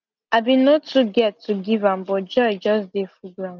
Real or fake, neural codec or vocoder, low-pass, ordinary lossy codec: real; none; 7.2 kHz; none